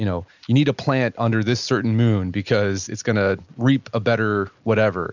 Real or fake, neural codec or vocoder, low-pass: real; none; 7.2 kHz